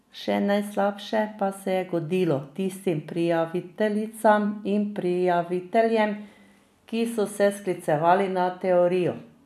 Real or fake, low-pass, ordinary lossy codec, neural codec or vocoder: real; 14.4 kHz; none; none